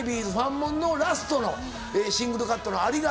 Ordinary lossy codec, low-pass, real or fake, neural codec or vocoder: none; none; real; none